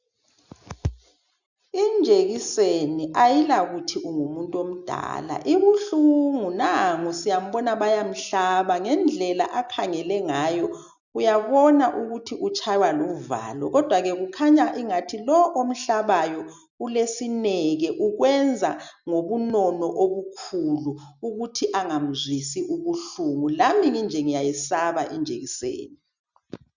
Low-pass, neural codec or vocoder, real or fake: 7.2 kHz; none; real